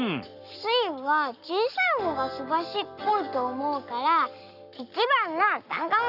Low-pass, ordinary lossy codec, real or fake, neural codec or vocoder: 5.4 kHz; none; fake; codec, 44.1 kHz, 7.8 kbps, Pupu-Codec